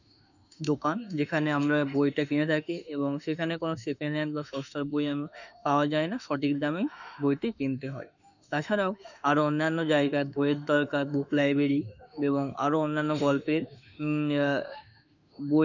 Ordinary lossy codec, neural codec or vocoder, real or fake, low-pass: none; autoencoder, 48 kHz, 32 numbers a frame, DAC-VAE, trained on Japanese speech; fake; 7.2 kHz